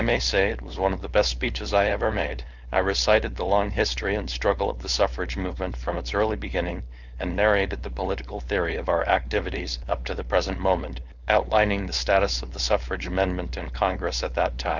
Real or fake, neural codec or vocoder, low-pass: fake; codec, 16 kHz, 4.8 kbps, FACodec; 7.2 kHz